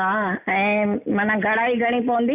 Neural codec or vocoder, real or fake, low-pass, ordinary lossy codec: none; real; 3.6 kHz; none